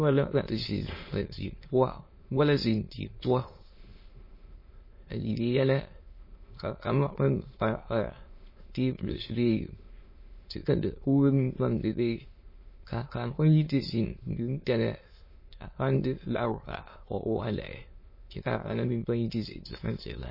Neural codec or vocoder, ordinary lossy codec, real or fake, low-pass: autoencoder, 22.05 kHz, a latent of 192 numbers a frame, VITS, trained on many speakers; MP3, 24 kbps; fake; 5.4 kHz